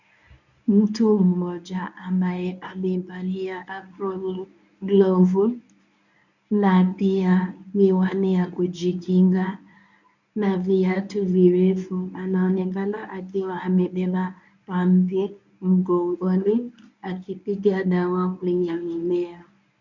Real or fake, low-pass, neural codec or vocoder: fake; 7.2 kHz; codec, 24 kHz, 0.9 kbps, WavTokenizer, medium speech release version 1